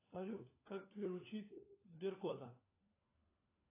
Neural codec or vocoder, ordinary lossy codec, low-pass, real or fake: codec, 16 kHz, 4 kbps, FunCodec, trained on LibriTTS, 50 frames a second; AAC, 24 kbps; 3.6 kHz; fake